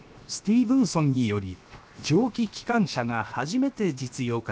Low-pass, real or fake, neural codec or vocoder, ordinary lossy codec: none; fake; codec, 16 kHz, 0.7 kbps, FocalCodec; none